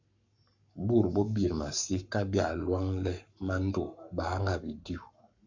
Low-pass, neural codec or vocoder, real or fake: 7.2 kHz; codec, 44.1 kHz, 7.8 kbps, Pupu-Codec; fake